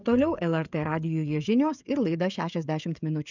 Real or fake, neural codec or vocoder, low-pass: fake; codec, 16 kHz, 16 kbps, FreqCodec, smaller model; 7.2 kHz